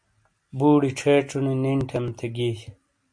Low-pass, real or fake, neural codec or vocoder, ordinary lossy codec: 9.9 kHz; real; none; MP3, 48 kbps